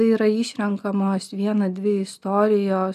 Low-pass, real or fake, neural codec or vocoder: 14.4 kHz; real; none